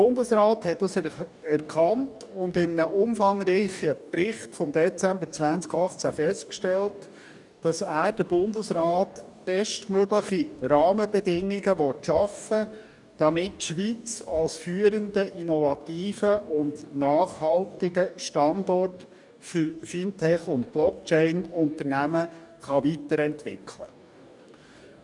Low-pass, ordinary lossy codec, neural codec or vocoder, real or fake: 10.8 kHz; none; codec, 44.1 kHz, 2.6 kbps, DAC; fake